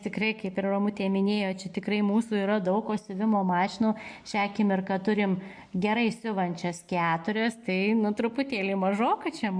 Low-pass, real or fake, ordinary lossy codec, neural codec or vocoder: 9.9 kHz; real; MP3, 64 kbps; none